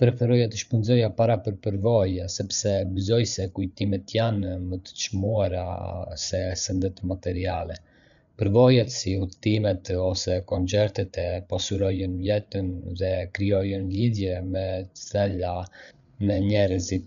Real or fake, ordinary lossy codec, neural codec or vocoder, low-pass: fake; none; codec, 16 kHz, 8 kbps, FreqCodec, larger model; 7.2 kHz